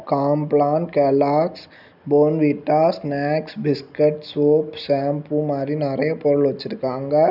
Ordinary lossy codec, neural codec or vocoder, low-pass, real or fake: none; none; 5.4 kHz; real